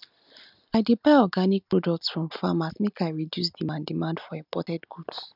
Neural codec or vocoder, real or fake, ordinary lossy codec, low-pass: none; real; none; 5.4 kHz